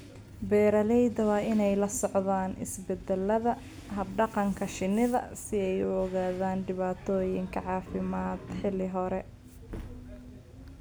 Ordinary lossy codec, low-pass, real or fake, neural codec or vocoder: none; none; real; none